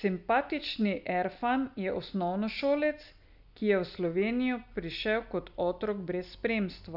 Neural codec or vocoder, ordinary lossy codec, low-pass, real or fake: none; none; 5.4 kHz; real